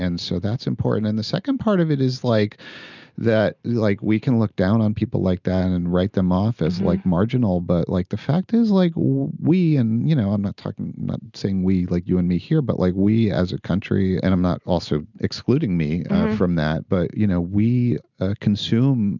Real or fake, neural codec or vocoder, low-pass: real; none; 7.2 kHz